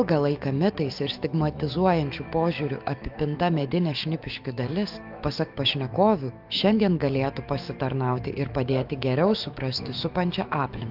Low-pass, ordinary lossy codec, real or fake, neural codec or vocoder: 5.4 kHz; Opus, 24 kbps; fake; autoencoder, 48 kHz, 128 numbers a frame, DAC-VAE, trained on Japanese speech